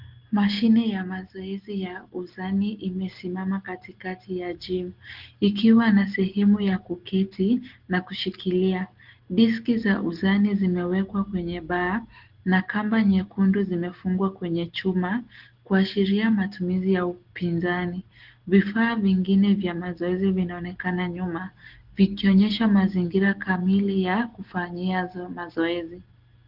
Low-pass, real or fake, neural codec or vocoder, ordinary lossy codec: 5.4 kHz; real; none; Opus, 16 kbps